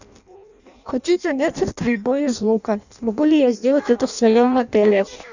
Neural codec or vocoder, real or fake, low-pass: codec, 16 kHz in and 24 kHz out, 0.6 kbps, FireRedTTS-2 codec; fake; 7.2 kHz